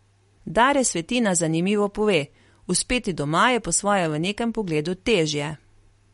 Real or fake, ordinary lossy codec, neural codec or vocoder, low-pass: real; MP3, 48 kbps; none; 19.8 kHz